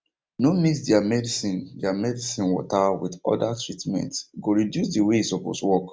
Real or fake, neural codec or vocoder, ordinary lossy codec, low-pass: real; none; none; none